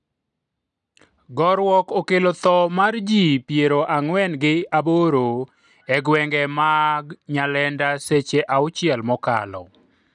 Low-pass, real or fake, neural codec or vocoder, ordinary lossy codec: 10.8 kHz; real; none; none